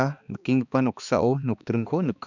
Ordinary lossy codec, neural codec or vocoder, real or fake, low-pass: none; codec, 16 kHz, 2 kbps, X-Codec, HuBERT features, trained on balanced general audio; fake; 7.2 kHz